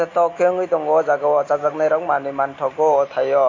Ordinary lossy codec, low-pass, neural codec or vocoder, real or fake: AAC, 32 kbps; 7.2 kHz; none; real